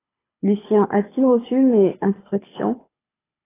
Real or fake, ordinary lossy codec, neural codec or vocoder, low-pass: fake; AAC, 16 kbps; codec, 24 kHz, 6 kbps, HILCodec; 3.6 kHz